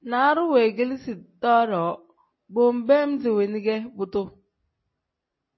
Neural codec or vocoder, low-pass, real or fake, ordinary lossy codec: none; 7.2 kHz; real; MP3, 24 kbps